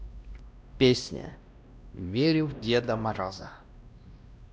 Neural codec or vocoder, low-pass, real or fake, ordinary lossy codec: codec, 16 kHz, 1 kbps, X-Codec, WavLM features, trained on Multilingual LibriSpeech; none; fake; none